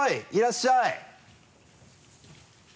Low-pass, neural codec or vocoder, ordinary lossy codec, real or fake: none; none; none; real